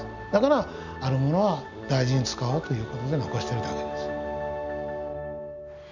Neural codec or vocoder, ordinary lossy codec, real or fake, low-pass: none; none; real; 7.2 kHz